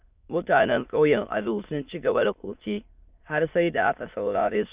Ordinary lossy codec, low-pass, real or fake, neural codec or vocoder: Opus, 64 kbps; 3.6 kHz; fake; autoencoder, 22.05 kHz, a latent of 192 numbers a frame, VITS, trained on many speakers